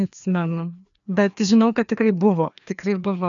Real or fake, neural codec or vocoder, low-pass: fake; codec, 16 kHz, 2 kbps, FreqCodec, larger model; 7.2 kHz